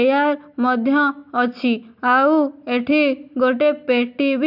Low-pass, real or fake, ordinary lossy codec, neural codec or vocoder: 5.4 kHz; real; none; none